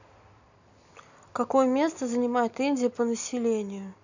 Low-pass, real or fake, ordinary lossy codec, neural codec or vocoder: 7.2 kHz; real; none; none